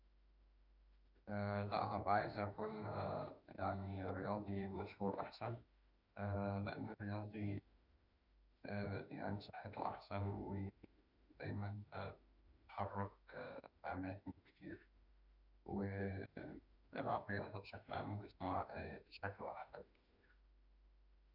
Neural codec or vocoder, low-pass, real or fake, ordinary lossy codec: autoencoder, 48 kHz, 32 numbers a frame, DAC-VAE, trained on Japanese speech; 5.4 kHz; fake; none